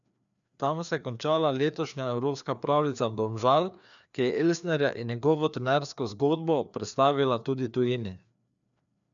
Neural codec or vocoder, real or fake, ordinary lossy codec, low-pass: codec, 16 kHz, 2 kbps, FreqCodec, larger model; fake; none; 7.2 kHz